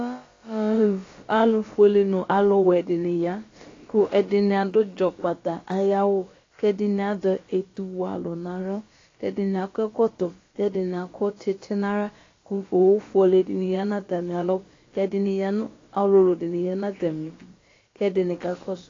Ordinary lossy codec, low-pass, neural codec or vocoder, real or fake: AAC, 32 kbps; 7.2 kHz; codec, 16 kHz, about 1 kbps, DyCAST, with the encoder's durations; fake